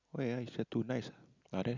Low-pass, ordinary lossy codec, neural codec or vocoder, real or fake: 7.2 kHz; Opus, 64 kbps; none; real